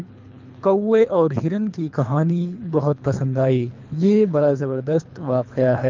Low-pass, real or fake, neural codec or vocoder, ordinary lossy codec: 7.2 kHz; fake; codec, 24 kHz, 3 kbps, HILCodec; Opus, 24 kbps